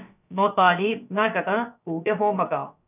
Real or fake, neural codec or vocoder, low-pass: fake; codec, 16 kHz, about 1 kbps, DyCAST, with the encoder's durations; 3.6 kHz